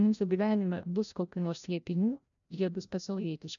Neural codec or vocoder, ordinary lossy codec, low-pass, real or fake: codec, 16 kHz, 0.5 kbps, FreqCodec, larger model; MP3, 96 kbps; 7.2 kHz; fake